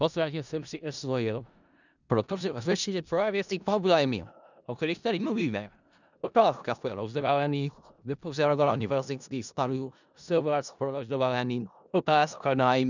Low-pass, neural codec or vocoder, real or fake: 7.2 kHz; codec, 16 kHz in and 24 kHz out, 0.4 kbps, LongCat-Audio-Codec, four codebook decoder; fake